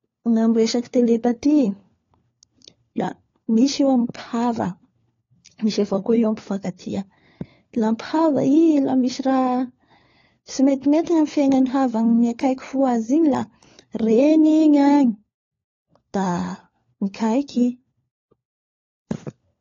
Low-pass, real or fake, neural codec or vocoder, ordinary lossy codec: 7.2 kHz; fake; codec, 16 kHz, 4 kbps, FunCodec, trained on LibriTTS, 50 frames a second; AAC, 32 kbps